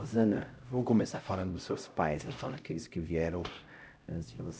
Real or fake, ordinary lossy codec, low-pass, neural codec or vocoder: fake; none; none; codec, 16 kHz, 0.5 kbps, X-Codec, HuBERT features, trained on LibriSpeech